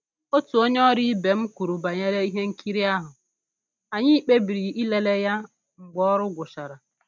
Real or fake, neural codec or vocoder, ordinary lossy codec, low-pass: real; none; none; none